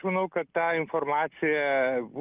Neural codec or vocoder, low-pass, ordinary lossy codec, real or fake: none; 3.6 kHz; Opus, 24 kbps; real